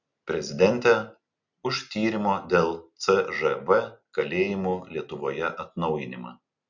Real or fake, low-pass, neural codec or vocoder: real; 7.2 kHz; none